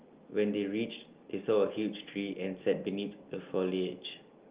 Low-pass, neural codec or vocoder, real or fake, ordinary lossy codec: 3.6 kHz; none; real; Opus, 32 kbps